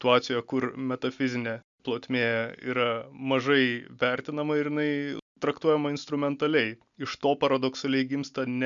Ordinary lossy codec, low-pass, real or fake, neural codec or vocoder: MP3, 96 kbps; 7.2 kHz; real; none